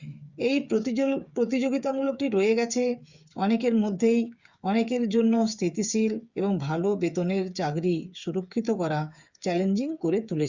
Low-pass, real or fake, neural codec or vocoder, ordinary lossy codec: 7.2 kHz; fake; codec, 16 kHz, 8 kbps, FreqCodec, smaller model; Opus, 64 kbps